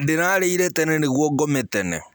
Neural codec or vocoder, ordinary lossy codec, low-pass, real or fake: none; none; none; real